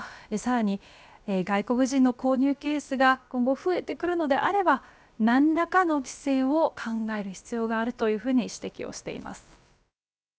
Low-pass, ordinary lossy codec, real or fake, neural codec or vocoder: none; none; fake; codec, 16 kHz, about 1 kbps, DyCAST, with the encoder's durations